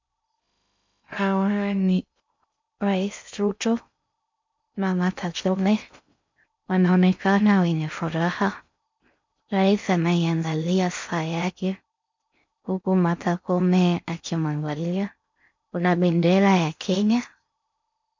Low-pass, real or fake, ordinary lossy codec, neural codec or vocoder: 7.2 kHz; fake; MP3, 64 kbps; codec, 16 kHz in and 24 kHz out, 0.6 kbps, FocalCodec, streaming, 2048 codes